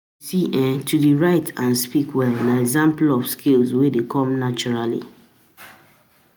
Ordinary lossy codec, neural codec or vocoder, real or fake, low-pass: none; none; real; none